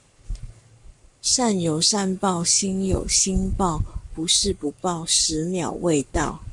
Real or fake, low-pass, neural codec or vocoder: fake; 10.8 kHz; codec, 44.1 kHz, 7.8 kbps, Pupu-Codec